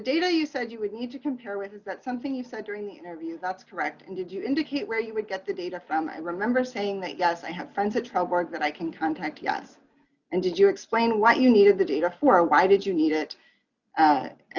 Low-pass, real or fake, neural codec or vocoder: 7.2 kHz; real; none